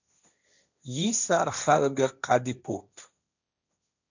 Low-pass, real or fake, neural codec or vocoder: 7.2 kHz; fake; codec, 16 kHz, 1.1 kbps, Voila-Tokenizer